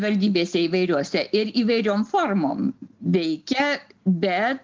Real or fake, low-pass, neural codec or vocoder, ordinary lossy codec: real; 7.2 kHz; none; Opus, 32 kbps